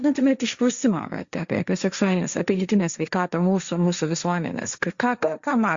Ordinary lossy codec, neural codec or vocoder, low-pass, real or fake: Opus, 64 kbps; codec, 16 kHz, 1.1 kbps, Voila-Tokenizer; 7.2 kHz; fake